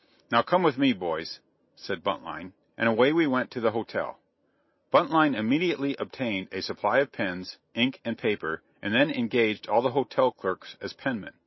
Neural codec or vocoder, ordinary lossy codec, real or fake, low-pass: none; MP3, 24 kbps; real; 7.2 kHz